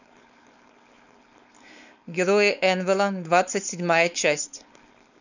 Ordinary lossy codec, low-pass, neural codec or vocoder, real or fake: none; 7.2 kHz; codec, 16 kHz, 4.8 kbps, FACodec; fake